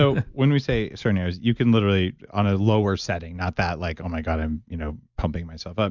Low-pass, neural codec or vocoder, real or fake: 7.2 kHz; none; real